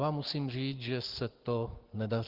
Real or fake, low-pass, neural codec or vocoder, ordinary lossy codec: real; 5.4 kHz; none; Opus, 16 kbps